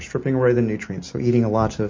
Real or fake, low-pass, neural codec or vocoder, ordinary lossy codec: real; 7.2 kHz; none; MP3, 32 kbps